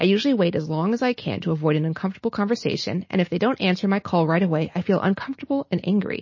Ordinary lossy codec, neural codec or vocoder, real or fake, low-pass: MP3, 32 kbps; none; real; 7.2 kHz